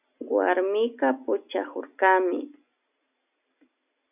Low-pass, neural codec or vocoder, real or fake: 3.6 kHz; none; real